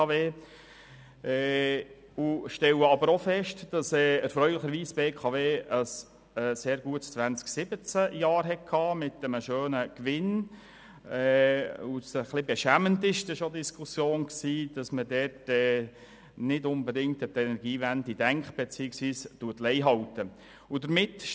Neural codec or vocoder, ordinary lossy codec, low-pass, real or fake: none; none; none; real